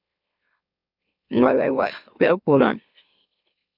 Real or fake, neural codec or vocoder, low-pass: fake; autoencoder, 44.1 kHz, a latent of 192 numbers a frame, MeloTTS; 5.4 kHz